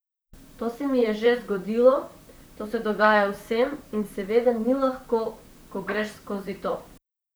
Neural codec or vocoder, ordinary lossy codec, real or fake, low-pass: vocoder, 44.1 kHz, 128 mel bands, Pupu-Vocoder; none; fake; none